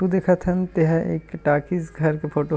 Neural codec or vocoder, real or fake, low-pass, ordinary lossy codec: none; real; none; none